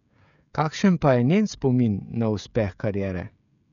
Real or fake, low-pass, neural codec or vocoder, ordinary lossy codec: fake; 7.2 kHz; codec, 16 kHz, 16 kbps, FreqCodec, smaller model; none